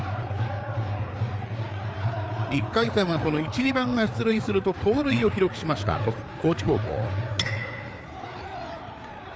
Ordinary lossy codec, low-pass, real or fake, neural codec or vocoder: none; none; fake; codec, 16 kHz, 4 kbps, FreqCodec, larger model